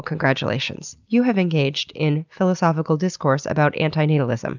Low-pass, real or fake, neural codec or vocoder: 7.2 kHz; real; none